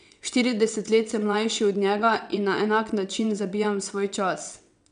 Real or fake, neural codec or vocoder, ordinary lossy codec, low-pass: fake; vocoder, 22.05 kHz, 80 mel bands, WaveNeXt; none; 9.9 kHz